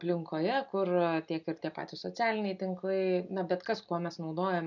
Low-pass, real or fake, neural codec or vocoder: 7.2 kHz; real; none